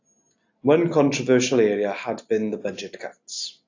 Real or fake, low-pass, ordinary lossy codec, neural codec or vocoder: real; 7.2 kHz; AAC, 48 kbps; none